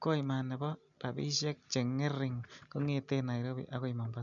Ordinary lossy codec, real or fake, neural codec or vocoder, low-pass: none; real; none; 7.2 kHz